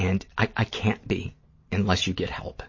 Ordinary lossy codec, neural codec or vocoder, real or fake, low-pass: MP3, 32 kbps; vocoder, 44.1 kHz, 128 mel bands every 512 samples, BigVGAN v2; fake; 7.2 kHz